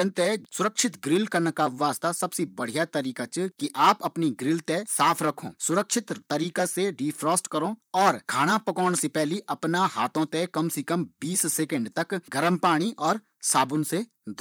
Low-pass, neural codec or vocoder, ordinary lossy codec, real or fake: none; vocoder, 44.1 kHz, 128 mel bands, Pupu-Vocoder; none; fake